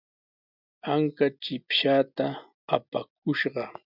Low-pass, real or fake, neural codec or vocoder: 5.4 kHz; real; none